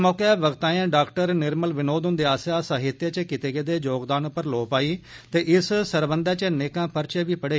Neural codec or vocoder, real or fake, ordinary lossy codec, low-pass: none; real; none; none